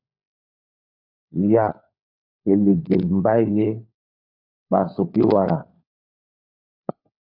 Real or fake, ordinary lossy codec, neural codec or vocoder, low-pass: fake; AAC, 48 kbps; codec, 16 kHz, 4 kbps, FunCodec, trained on LibriTTS, 50 frames a second; 5.4 kHz